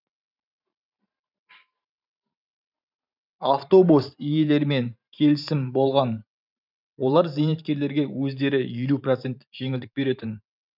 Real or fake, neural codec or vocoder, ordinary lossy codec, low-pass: fake; vocoder, 44.1 kHz, 128 mel bands every 512 samples, BigVGAN v2; none; 5.4 kHz